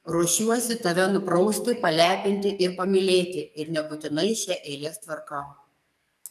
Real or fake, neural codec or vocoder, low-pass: fake; codec, 44.1 kHz, 2.6 kbps, SNAC; 14.4 kHz